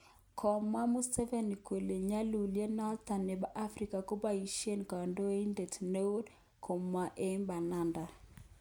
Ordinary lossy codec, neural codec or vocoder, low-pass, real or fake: none; none; none; real